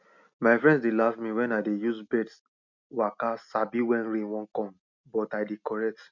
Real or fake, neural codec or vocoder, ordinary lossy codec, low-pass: real; none; none; 7.2 kHz